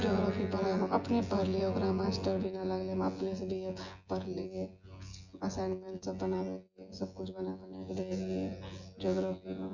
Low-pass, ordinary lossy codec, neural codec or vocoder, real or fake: 7.2 kHz; Opus, 64 kbps; vocoder, 24 kHz, 100 mel bands, Vocos; fake